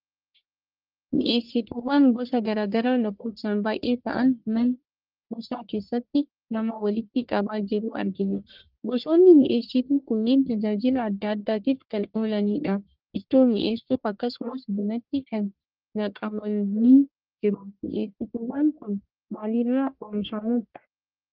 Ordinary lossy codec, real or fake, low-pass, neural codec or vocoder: Opus, 32 kbps; fake; 5.4 kHz; codec, 44.1 kHz, 1.7 kbps, Pupu-Codec